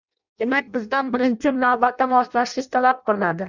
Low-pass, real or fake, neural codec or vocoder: 7.2 kHz; fake; codec, 16 kHz in and 24 kHz out, 0.6 kbps, FireRedTTS-2 codec